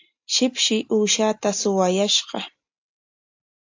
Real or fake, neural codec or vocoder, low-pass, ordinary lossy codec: real; none; 7.2 kHz; AAC, 48 kbps